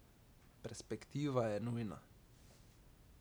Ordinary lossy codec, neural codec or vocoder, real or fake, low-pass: none; none; real; none